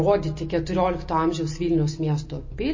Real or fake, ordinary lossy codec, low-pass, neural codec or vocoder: real; MP3, 32 kbps; 7.2 kHz; none